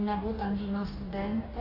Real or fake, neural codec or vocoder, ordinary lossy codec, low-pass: fake; codec, 44.1 kHz, 2.6 kbps, DAC; AAC, 24 kbps; 5.4 kHz